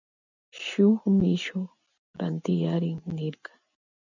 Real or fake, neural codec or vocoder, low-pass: real; none; 7.2 kHz